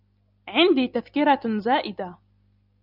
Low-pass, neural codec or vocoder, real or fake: 5.4 kHz; none; real